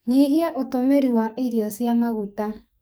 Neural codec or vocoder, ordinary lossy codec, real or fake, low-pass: codec, 44.1 kHz, 2.6 kbps, SNAC; none; fake; none